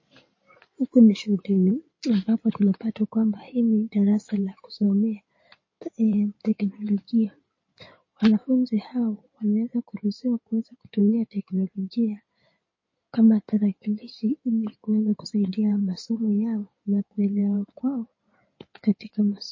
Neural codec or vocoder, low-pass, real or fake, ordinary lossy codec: codec, 16 kHz in and 24 kHz out, 2.2 kbps, FireRedTTS-2 codec; 7.2 kHz; fake; MP3, 32 kbps